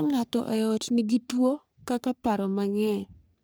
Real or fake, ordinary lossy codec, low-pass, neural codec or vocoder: fake; none; none; codec, 44.1 kHz, 3.4 kbps, Pupu-Codec